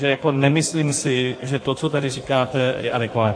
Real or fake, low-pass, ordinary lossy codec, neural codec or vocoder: fake; 9.9 kHz; AAC, 48 kbps; codec, 16 kHz in and 24 kHz out, 1.1 kbps, FireRedTTS-2 codec